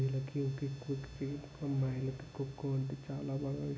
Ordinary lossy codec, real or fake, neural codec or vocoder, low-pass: none; real; none; none